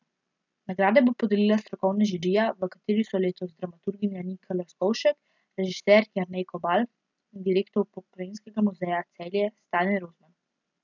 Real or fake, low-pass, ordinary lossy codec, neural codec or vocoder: real; 7.2 kHz; none; none